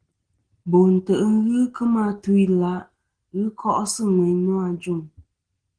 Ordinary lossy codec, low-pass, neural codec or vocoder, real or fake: Opus, 16 kbps; 9.9 kHz; none; real